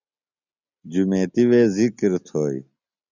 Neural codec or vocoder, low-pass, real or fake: none; 7.2 kHz; real